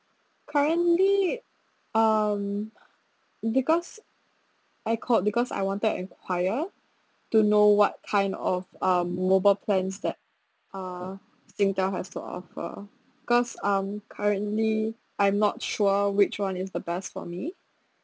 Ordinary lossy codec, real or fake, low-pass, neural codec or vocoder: none; real; none; none